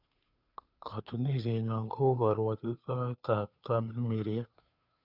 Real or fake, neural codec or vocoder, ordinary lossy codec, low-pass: fake; codec, 16 kHz, 2 kbps, FunCodec, trained on Chinese and English, 25 frames a second; none; 5.4 kHz